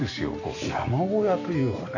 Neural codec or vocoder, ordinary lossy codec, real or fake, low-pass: none; none; real; 7.2 kHz